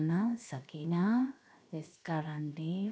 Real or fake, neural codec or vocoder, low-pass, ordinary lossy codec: fake; codec, 16 kHz, 0.7 kbps, FocalCodec; none; none